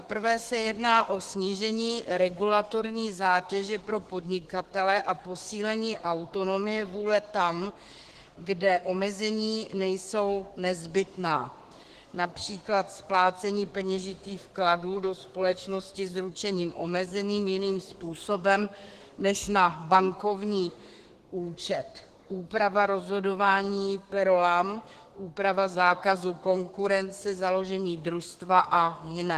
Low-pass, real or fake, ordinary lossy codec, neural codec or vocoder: 14.4 kHz; fake; Opus, 16 kbps; codec, 32 kHz, 1.9 kbps, SNAC